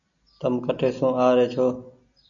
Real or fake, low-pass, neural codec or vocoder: real; 7.2 kHz; none